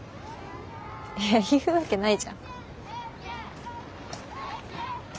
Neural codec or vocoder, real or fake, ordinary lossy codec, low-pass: none; real; none; none